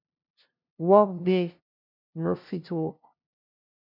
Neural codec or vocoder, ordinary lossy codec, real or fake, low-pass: codec, 16 kHz, 0.5 kbps, FunCodec, trained on LibriTTS, 25 frames a second; MP3, 48 kbps; fake; 5.4 kHz